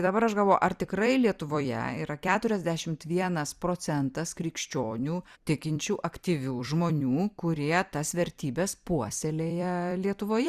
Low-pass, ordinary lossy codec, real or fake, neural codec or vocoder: 14.4 kHz; Opus, 64 kbps; fake; vocoder, 44.1 kHz, 128 mel bands every 256 samples, BigVGAN v2